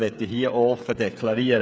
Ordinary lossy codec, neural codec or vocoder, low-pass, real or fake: none; codec, 16 kHz, 16 kbps, FreqCodec, smaller model; none; fake